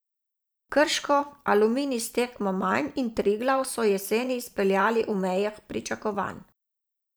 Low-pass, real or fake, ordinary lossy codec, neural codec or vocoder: none; fake; none; vocoder, 44.1 kHz, 128 mel bands every 512 samples, BigVGAN v2